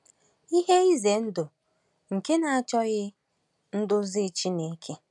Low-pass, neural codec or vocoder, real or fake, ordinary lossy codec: 10.8 kHz; none; real; none